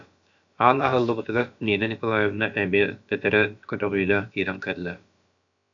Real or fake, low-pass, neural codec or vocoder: fake; 7.2 kHz; codec, 16 kHz, about 1 kbps, DyCAST, with the encoder's durations